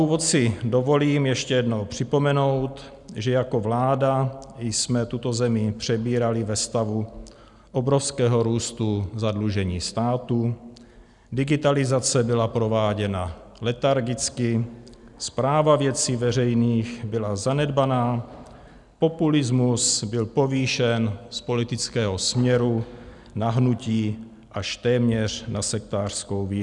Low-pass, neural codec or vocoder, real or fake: 10.8 kHz; none; real